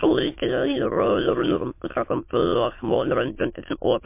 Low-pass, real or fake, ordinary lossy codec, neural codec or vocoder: 3.6 kHz; fake; MP3, 24 kbps; autoencoder, 22.05 kHz, a latent of 192 numbers a frame, VITS, trained on many speakers